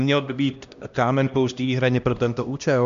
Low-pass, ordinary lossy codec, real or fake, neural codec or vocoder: 7.2 kHz; MP3, 64 kbps; fake; codec, 16 kHz, 1 kbps, X-Codec, HuBERT features, trained on LibriSpeech